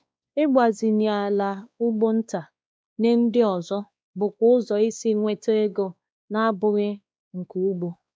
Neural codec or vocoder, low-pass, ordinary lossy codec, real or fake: codec, 16 kHz, 2 kbps, X-Codec, WavLM features, trained on Multilingual LibriSpeech; none; none; fake